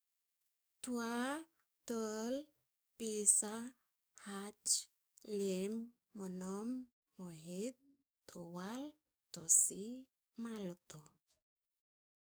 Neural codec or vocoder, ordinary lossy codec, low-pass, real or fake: codec, 44.1 kHz, 7.8 kbps, DAC; none; none; fake